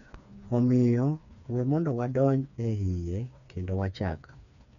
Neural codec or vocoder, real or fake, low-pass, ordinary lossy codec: codec, 16 kHz, 4 kbps, FreqCodec, smaller model; fake; 7.2 kHz; none